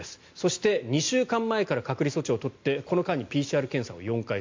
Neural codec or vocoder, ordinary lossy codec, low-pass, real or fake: none; MP3, 48 kbps; 7.2 kHz; real